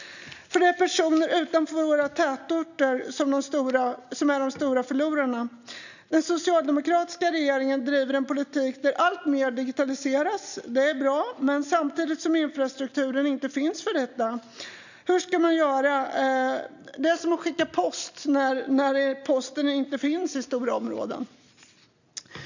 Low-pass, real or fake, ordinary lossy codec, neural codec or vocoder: 7.2 kHz; real; none; none